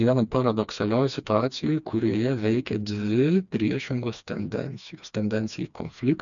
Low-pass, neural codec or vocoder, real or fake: 7.2 kHz; codec, 16 kHz, 2 kbps, FreqCodec, smaller model; fake